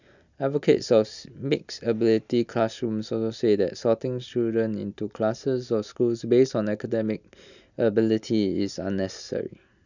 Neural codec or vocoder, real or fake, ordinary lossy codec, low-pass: none; real; none; 7.2 kHz